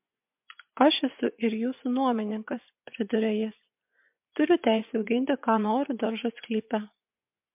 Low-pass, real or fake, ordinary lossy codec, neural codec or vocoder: 3.6 kHz; fake; MP3, 32 kbps; vocoder, 24 kHz, 100 mel bands, Vocos